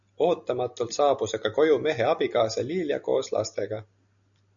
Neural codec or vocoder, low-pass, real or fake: none; 7.2 kHz; real